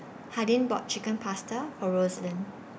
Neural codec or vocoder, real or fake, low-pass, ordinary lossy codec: none; real; none; none